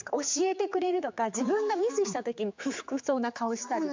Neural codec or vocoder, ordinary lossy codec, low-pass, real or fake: codec, 16 kHz, 4 kbps, X-Codec, HuBERT features, trained on general audio; MP3, 64 kbps; 7.2 kHz; fake